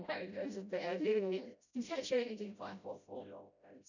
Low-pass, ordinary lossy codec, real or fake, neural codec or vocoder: 7.2 kHz; none; fake; codec, 16 kHz, 0.5 kbps, FreqCodec, smaller model